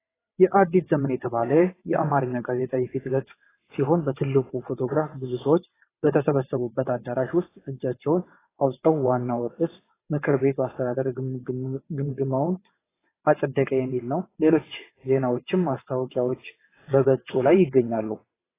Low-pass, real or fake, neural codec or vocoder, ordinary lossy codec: 3.6 kHz; fake; vocoder, 22.05 kHz, 80 mel bands, WaveNeXt; AAC, 16 kbps